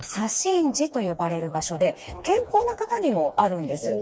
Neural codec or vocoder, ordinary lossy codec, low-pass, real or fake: codec, 16 kHz, 2 kbps, FreqCodec, smaller model; none; none; fake